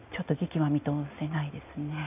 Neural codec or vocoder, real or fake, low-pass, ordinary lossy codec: none; real; 3.6 kHz; AAC, 32 kbps